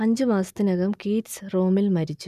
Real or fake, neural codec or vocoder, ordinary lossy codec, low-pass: real; none; none; 14.4 kHz